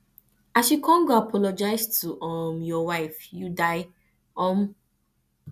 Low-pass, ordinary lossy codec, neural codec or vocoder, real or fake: 14.4 kHz; none; none; real